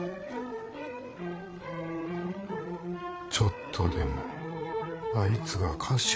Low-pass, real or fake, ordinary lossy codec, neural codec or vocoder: none; fake; none; codec, 16 kHz, 8 kbps, FreqCodec, larger model